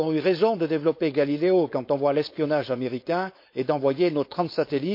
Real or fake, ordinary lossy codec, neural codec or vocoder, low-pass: fake; MP3, 32 kbps; codec, 16 kHz, 4.8 kbps, FACodec; 5.4 kHz